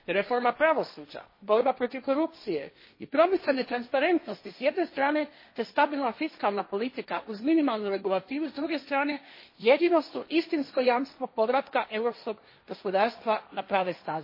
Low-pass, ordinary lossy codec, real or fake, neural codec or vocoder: 5.4 kHz; MP3, 24 kbps; fake; codec, 16 kHz, 1.1 kbps, Voila-Tokenizer